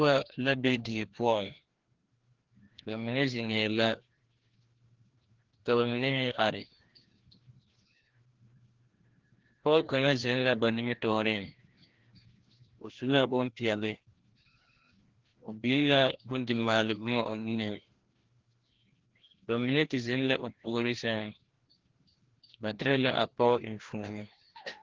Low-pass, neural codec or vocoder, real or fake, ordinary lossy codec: 7.2 kHz; codec, 16 kHz, 1 kbps, FreqCodec, larger model; fake; Opus, 16 kbps